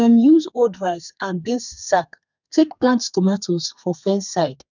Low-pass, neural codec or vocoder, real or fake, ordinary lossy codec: 7.2 kHz; codec, 32 kHz, 1.9 kbps, SNAC; fake; none